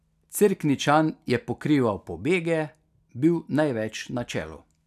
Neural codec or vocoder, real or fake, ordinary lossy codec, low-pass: none; real; none; 14.4 kHz